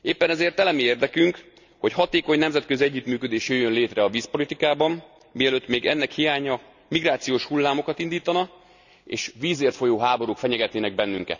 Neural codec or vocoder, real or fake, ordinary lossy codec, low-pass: none; real; none; 7.2 kHz